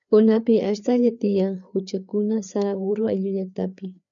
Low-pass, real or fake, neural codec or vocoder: 7.2 kHz; fake; codec, 16 kHz, 4 kbps, FreqCodec, larger model